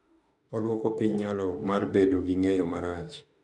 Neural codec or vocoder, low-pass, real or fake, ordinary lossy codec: autoencoder, 48 kHz, 32 numbers a frame, DAC-VAE, trained on Japanese speech; 10.8 kHz; fake; MP3, 96 kbps